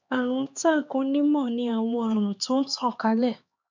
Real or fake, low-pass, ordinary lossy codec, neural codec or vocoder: fake; 7.2 kHz; MP3, 64 kbps; codec, 16 kHz, 2 kbps, X-Codec, HuBERT features, trained on LibriSpeech